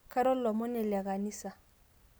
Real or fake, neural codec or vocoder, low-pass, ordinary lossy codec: real; none; none; none